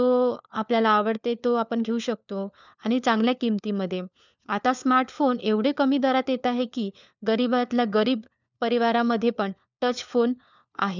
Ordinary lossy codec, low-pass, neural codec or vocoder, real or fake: none; 7.2 kHz; codec, 16 kHz, 4 kbps, FunCodec, trained on LibriTTS, 50 frames a second; fake